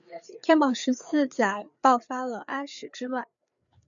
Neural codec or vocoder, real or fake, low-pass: codec, 16 kHz, 4 kbps, FreqCodec, larger model; fake; 7.2 kHz